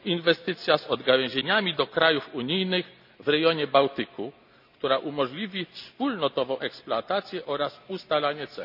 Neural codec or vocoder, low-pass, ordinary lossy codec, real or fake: none; 5.4 kHz; none; real